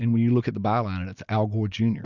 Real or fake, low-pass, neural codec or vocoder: real; 7.2 kHz; none